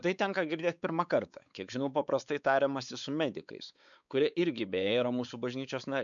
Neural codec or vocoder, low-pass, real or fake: codec, 16 kHz, 4 kbps, X-Codec, WavLM features, trained on Multilingual LibriSpeech; 7.2 kHz; fake